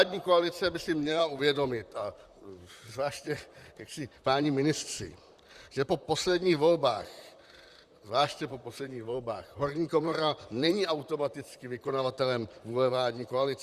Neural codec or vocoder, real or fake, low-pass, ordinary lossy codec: vocoder, 44.1 kHz, 128 mel bands, Pupu-Vocoder; fake; 14.4 kHz; Opus, 64 kbps